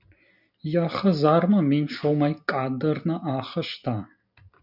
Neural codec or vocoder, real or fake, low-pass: none; real; 5.4 kHz